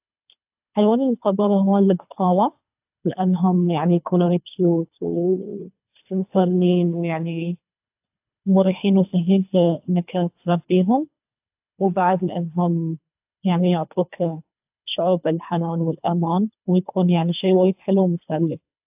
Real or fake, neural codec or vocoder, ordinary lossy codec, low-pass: fake; codec, 24 kHz, 3 kbps, HILCodec; AAC, 32 kbps; 3.6 kHz